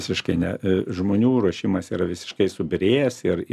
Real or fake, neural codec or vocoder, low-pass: real; none; 14.4 kHz